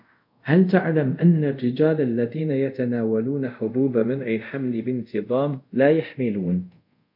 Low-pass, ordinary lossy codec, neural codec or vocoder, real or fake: 5.4 kHz; MP3, 48 kbps; codec, 24 kHz, 0.5 kbps, DualCodec; fake